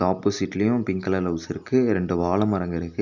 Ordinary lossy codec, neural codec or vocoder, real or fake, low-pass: none; none; real; 7.2 kHz